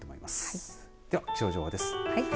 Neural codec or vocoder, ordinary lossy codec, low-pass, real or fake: none; none; none; real